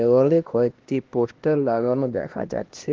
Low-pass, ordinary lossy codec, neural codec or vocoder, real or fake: 7.2 kHz; Opus, 16 kbps; codec, 16 kHz, 1 kbps, X-Codec, HuBERT features, trained on LibriSpeech; fake